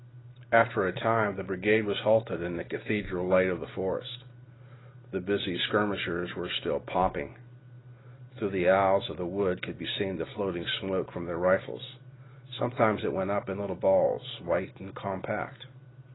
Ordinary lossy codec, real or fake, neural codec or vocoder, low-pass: AAC, 16 kbps; real; none; 7.2 kHz